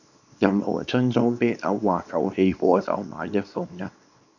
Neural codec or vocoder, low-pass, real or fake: codec, 24 kHz, 0.9 kbps, WavTokenizer, small release; 7.2 kHz; fake